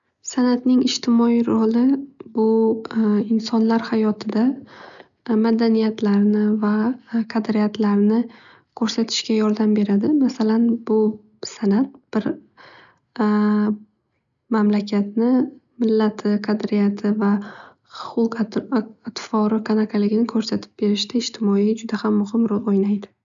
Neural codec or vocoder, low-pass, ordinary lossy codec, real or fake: none; 7.2 kHz; none; real